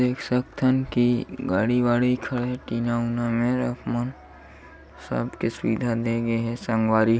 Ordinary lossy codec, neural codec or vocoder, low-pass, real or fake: none; none; none; real